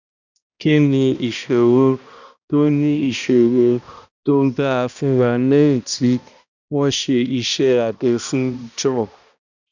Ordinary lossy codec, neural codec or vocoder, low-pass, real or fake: none; codec, 16 kHz, 1 kbps, X-Codec, HuBERT features, trained on balanced general audio; 7.2 kHz; fake